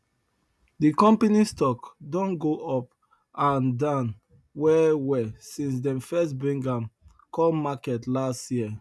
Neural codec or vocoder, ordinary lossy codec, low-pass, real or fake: none; none; none; real